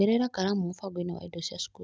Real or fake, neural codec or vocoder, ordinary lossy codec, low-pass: real; none; none; none